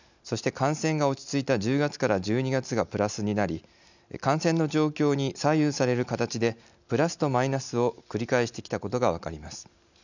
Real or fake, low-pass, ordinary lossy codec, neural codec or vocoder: real; 7.2 kHz; none; none